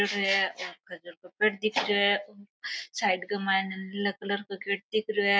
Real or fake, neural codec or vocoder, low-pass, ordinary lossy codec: real; none; none; none